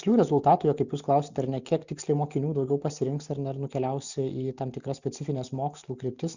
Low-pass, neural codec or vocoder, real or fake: 7.2 kHz; none; real